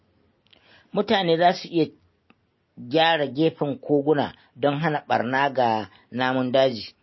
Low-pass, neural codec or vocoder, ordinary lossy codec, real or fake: 7.2 kHz; none; MP3, 24 kbps; real